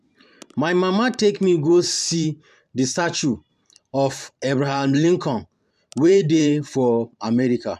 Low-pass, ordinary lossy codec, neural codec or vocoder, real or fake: 14.4 kHz; MP3, 96 kbps; vocoder, 44.1 kHz, 128 mel bands every 512 samples, BigVGAN v2; fake